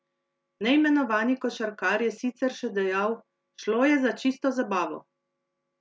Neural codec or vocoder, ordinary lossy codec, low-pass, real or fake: none; none; none; real